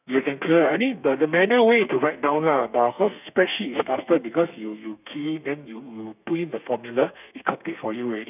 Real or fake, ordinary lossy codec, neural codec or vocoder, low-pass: fake; none; codec, 32 kHz, 1.9 kbps, SNAC; 3.6 kHz